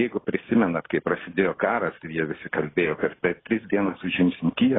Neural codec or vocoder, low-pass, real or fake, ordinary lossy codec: none; 7.2 kHz; real; AAC, 16 kbps